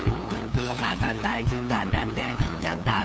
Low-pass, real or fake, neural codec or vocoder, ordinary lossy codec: none; fake; codec, 16 kHz, 2 kbps, FunCodec, trained on LibriTTS, 25 frames a second; none